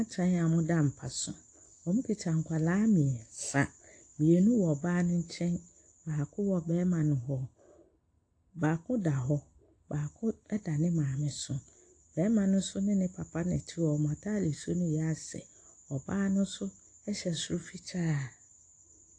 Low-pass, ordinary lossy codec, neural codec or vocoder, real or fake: 9.9 kHz; AAC, 48 kbps; none; real